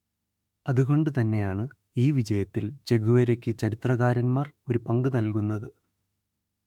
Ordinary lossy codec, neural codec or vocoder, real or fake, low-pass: MP3, 96 kbps; autoencoder, 48 kHz, 32 numbers a frame, DAC-VAE, trained on Japanese speech; fake; 19.8 kHz